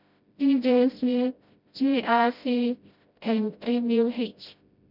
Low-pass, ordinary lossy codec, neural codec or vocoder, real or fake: 5.4 kHz; none; codec, 16 kHz, 0.5 kbps, FreqCodec, smaller model; fake